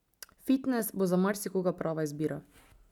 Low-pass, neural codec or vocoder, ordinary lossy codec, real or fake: 19.8 kHz; none; none; real